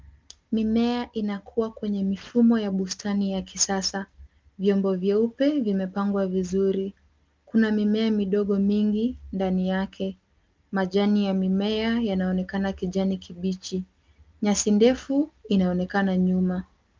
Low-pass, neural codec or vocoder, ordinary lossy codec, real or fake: 7.2 kHz; none; Opus, 24 kbps; real